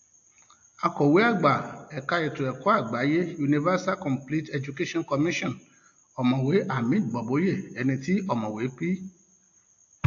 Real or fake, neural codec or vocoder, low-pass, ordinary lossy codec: real; none; 7.2 kHz; AAC, 48 kbps